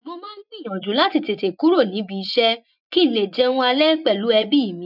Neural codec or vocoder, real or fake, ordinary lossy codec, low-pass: vocoder, 24 kHz, 100 mel bands, Vocos; fake; none; 5.4 kHz